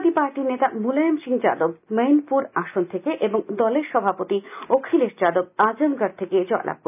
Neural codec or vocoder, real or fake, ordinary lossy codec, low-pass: none; real; none; 3.6 kHz